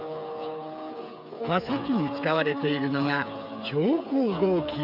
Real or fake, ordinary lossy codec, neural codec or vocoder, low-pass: fake; none; codec, 16 kHz, 8 kbps, FreqCodec, smaller model; 5.4 kHz